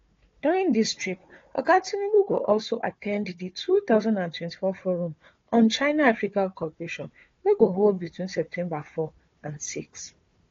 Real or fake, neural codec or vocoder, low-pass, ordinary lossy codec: fake; codec, 16 kHz, 4 kbps, FunCodec, trained on Chinese and English, 50 frames a second; 7.2 kHz; AAC, 32 kbps